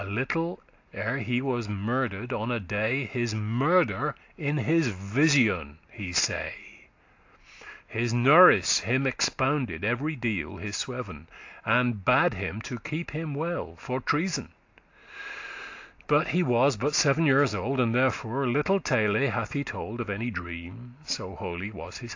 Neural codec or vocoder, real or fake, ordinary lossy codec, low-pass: none; real; AAC, 48 kbps; 7.2 kHz